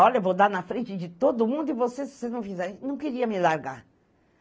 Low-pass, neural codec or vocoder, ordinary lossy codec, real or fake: none; none; none; real